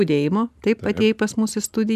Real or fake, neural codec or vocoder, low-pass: real; none; 14.4 kHz